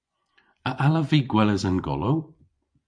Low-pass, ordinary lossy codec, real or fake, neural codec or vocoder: 9.9 kHz; MP3, 64 kbps; fake; vocoder, 24 kHz, 100 mel bands, Vocos